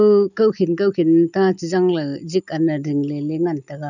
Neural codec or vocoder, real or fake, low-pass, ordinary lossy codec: none; real; 7.2 kHz; none